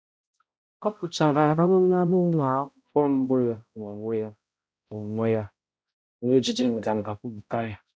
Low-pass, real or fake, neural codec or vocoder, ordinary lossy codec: none; fake; codec, 16 kHz, 0.5 kbps, X-Codec, HuBERT features, trained on balanced general audio; none